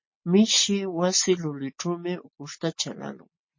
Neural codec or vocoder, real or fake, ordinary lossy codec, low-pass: none; real; MP3, 32 kbps; 7.2 kHz